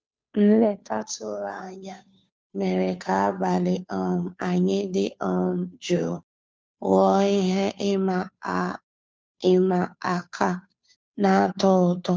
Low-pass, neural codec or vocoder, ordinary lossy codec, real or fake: none; codec, 16 kHz, 2 kbps, FunCodec, trained on Chinese and English, 25 frames a second; none; fake